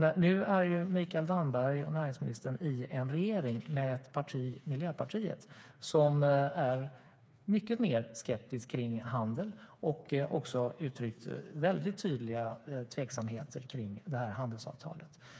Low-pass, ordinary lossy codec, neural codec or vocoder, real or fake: none; none; codec, 16 kHz, 4 kbps, FreqCodec, smaller model; fake